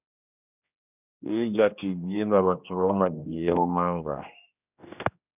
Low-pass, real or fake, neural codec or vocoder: 3.6 kHz; fake; codec, 16 kHz, 1 kbps, X-Codec, HuBERT features, trained on general audio